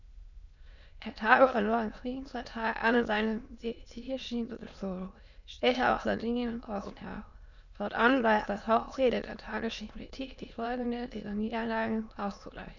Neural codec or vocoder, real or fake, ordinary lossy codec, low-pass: autoencoder, 22.05 kHz, a latent of 192 numbers a frame, VITS, trained on many speakers; fake; none; 7.2 kHz